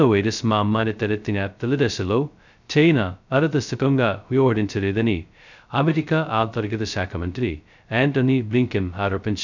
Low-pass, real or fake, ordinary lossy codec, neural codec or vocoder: 7.2 kHz; fake; none; codec, 16 kHz, 0.2 kbps, FocalCodec